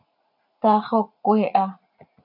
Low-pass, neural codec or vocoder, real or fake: 5.4 kHz; vocoder, 44.1 kHz, 80 mel bands, Vocos; fake